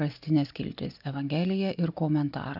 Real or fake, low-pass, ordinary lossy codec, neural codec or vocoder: real; 5.4 kHz; AAC, 48 kbps; none